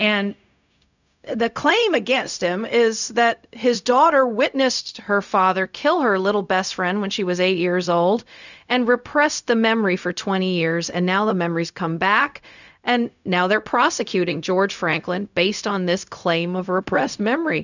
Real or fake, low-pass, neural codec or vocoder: fake; 7.2 kHz; codec, 16 kHz, 0.4 kbps, LongCat-Audio-Codec